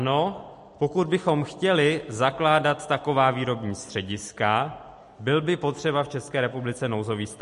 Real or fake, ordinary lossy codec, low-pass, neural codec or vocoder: real; MP3, 48 kbps; 14.4 kHz; none